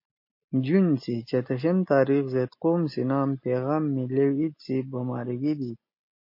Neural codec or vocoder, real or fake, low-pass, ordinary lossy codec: none; real; 5.4 kHz; MP3, 32 kbps